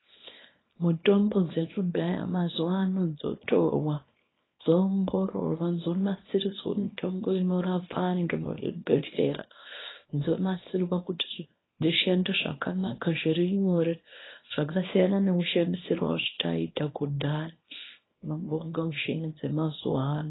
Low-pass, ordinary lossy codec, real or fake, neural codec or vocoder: 7.2 kHz; AAC, 16 kbps; fake; codec, 24 kHz, 0.9 kbps, WavTokenizer, small release